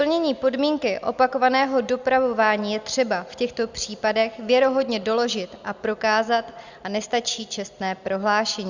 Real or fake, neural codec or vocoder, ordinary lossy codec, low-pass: real; none; Opus, 64 kbps; 7.2 kHz